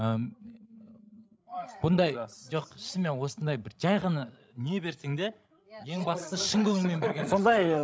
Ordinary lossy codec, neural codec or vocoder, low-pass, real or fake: none; codec, 16 kHz, 16 kbps, FreqCodec, larger model; none; fake